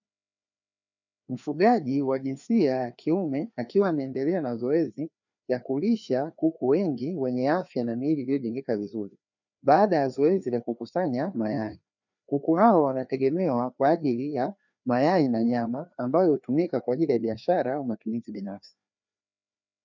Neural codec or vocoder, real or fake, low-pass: codec, 16 kHz, 2 kbps, FreqCodec, larger model; fake; 7.2 kHz